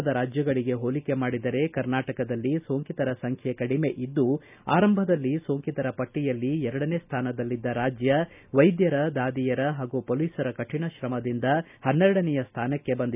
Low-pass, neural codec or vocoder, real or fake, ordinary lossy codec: 3.6 kHz; none; real; none